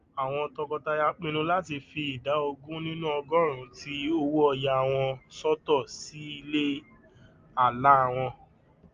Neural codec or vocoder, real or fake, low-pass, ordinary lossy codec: none; real; 7.2 kHz; Opus, 32 kbps